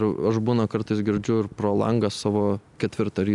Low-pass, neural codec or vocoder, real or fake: 10.8 kHz; none; real